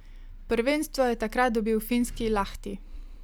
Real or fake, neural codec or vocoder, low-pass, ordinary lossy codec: real; none; none; none